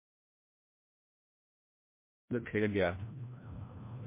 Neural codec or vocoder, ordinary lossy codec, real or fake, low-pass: codec, 16 kHz, 1 kbps, FreqCodec, larger model; MP3, 24 kbps; fake; 3.6 kHz